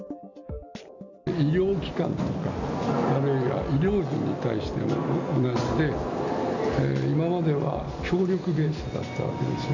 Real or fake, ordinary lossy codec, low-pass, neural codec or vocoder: real; none; 7.2 kHz; none